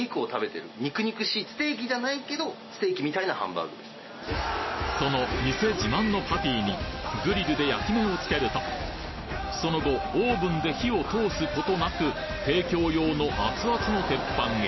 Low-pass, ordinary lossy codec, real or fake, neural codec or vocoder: 7.2 kHz; MP3, 24 kbps; real; none